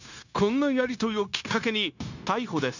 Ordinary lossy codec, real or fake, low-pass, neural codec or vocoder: none; fake; 7.2 kHz; codec, 16 kHz, 0.9 kbps, LongCat-Audio-Codec